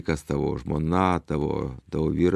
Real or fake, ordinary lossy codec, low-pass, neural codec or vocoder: real; MP3, 96 kbps; 14.4 kHz; none